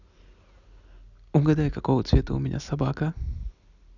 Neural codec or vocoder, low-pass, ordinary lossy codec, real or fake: none; 7.2 kHz; none; real